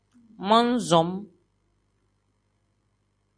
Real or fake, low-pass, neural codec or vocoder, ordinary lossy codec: real; 9.9 kHz; none; MP3, 48 kbps